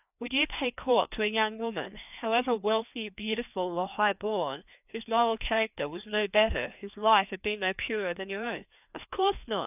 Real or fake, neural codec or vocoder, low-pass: fake; codec, 16 kHz, 2 kbps, FreqCodec, larger model; 3.6 kHz